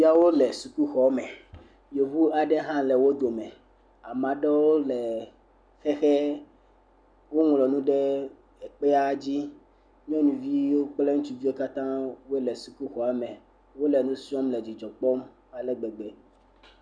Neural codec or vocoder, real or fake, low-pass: none; real; 9.9 kHz